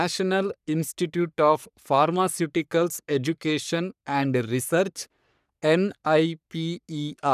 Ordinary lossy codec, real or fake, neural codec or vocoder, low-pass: none; fake; codec, 44.1 kHz, 3.4 kbps, Pupu-Codec; 14.4 kHz